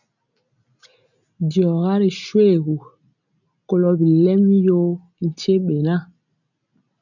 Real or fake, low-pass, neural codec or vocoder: real; 7.2 kHz; none